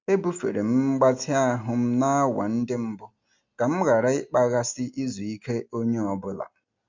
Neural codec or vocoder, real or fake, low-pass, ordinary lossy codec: none; real; 7.2 kHz; MP3, 64 kbps